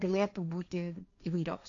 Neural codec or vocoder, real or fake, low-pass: codec, 16 kHz, 1.1 kbps, Voila-Tokenizer; fake; 7.2 kHz